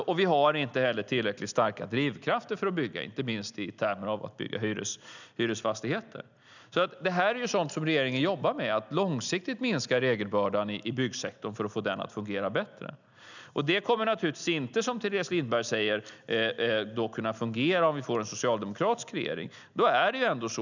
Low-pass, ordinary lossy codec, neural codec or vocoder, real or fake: 7.2 kHz; none; none; real